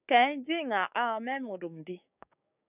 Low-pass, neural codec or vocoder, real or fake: 3.6 kHz; codec, 16 kHz, 6 kbps, DAC; fake